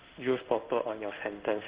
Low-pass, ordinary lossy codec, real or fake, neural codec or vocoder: 3.6 kHz; Opus, 16 kbps; fake; codec, 16 kHz in and 24 kHz out, 1 kbps, XY-Tokenizer